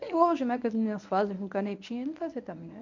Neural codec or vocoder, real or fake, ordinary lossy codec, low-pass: codec, 24 kHz, 0.9 kbps, WavTokenizer, medium speech release version 1; fake; none; 7.2 kHz